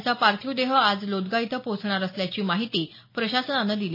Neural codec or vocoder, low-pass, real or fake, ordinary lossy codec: none; 5.4 kHz; real; MP3, 24 kbps